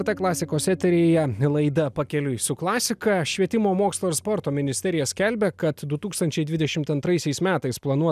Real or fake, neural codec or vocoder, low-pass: real; none; 14.4 kHz